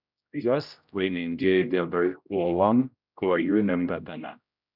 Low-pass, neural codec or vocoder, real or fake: 5.4 kHz; codec, 16 kHz, 0.5 kbps, X-Codec, HuBERT features, trained on general audio; fake